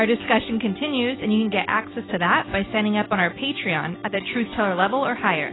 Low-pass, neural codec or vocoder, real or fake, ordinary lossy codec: 7.2 kHz; none; real; AAC, 16 kbps